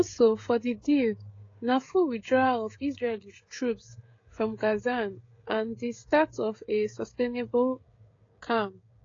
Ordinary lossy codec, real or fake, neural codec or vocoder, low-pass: AAC, 32 kbps; fake; codec, 16 kHz, 16 kbps, FreqCodec, smaller model; 7.2 kHz